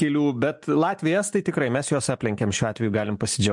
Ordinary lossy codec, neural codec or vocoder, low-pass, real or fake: MP3, 64 kbps; none; 10.8 kHz; real